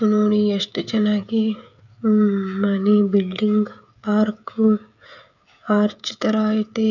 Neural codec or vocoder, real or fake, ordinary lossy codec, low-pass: codec, 16 kHz, 16 kbps, FreqCodec, smaller model; fake; none; 7.2 kHz